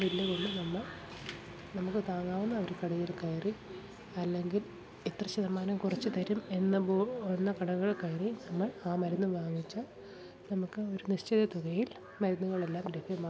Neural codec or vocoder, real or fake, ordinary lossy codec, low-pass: none; real; none; none